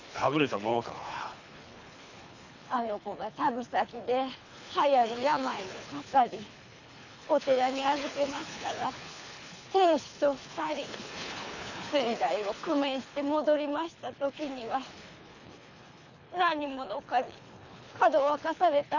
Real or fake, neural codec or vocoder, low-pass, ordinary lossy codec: fake; codec, 24 kHz, 3 kbps, HILCodec; 7.2 kHz; none